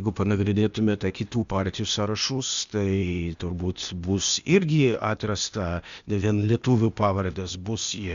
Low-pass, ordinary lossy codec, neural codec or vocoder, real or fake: 7.2 kHz; Opus, 64 kbps; codec, 16 kHz, 0.8 kbps, ZipCodec; fake